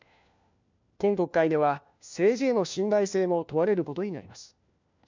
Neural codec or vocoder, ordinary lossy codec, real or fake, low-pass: codec, 16 kHz, 1 kbps, FunCodec, trained on LibriTTS, 50 frames a second; MP3, 64 kbps; fake; 7.2 kHz